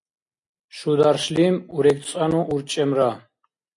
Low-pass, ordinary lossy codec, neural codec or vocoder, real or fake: 10.8 kHz; AAC, 48 kbps; none; real